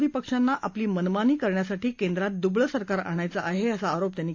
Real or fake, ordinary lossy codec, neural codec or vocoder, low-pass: real; AAC, 48 kbps; none; 7.2 kHz